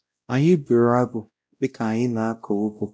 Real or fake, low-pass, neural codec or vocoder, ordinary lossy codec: fake; none; codec, 16 kHz, 0.5 kbps, X-Codec, WavLM features, trained on Multilingual LibriSpeech; none